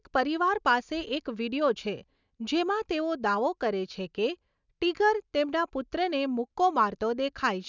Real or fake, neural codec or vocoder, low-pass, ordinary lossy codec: real; none; 7.2 kHz; none